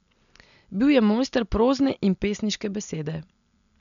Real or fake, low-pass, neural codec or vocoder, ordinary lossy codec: real; 7.2 kHz; none; none